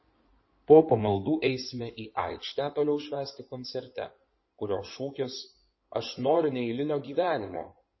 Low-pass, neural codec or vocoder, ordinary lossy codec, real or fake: 7.2 kHz; codec, 16 kHz in and 24 kHz out, 2.2 kbps, FireRedTTS-2 codec; MP3, 24 kbps; fake